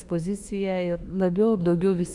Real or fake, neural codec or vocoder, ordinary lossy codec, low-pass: fake; autoencoder, 48 kHz, 32 numbers a frame, DAC-VAE, trained on Japanese speech; Opus, 64 kbps; 10.8 kHz